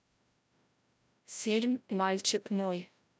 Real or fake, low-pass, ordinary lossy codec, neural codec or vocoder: fake; none; none; codec, 16 kHz, 0.5 kbps, FreqCodec, larger model